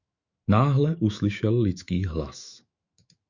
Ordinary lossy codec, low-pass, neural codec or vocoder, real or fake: Opus, 64 kbps; 7.2 kHz; autoencoder, 48 kHz, 128 numbers a frame, DAC-VAE, trained on Japanese speech; fake